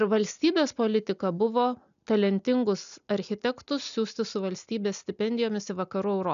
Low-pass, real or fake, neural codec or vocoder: 7.2 kHz; real; none